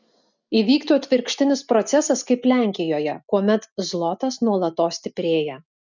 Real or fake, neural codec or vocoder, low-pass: real; none; 7.2 kHz